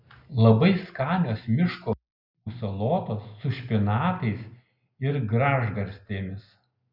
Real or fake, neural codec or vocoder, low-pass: real; none; 5.4 kHz